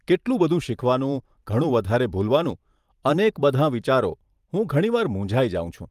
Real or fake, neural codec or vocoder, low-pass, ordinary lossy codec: fake; vocoder, 44.1 kHz, 128 mel bands every 512 samples, BigVGAN v2; 14.4 kHz; Opus, 32 kbps